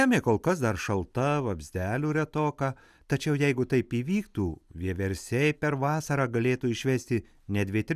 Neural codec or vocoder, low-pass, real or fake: none; 14.4 kHz; real